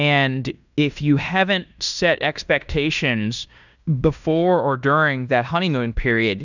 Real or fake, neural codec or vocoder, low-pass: fake; codec, 16 kHz in and 24 kHz out, 0.9 kbps, LongCat-Audio-Codec, fine tuned four codebook decoder; 7.2 kHz